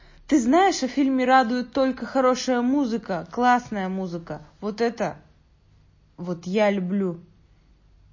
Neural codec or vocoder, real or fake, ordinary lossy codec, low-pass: none; real; MP3, 32 kbps; 7.2 kHz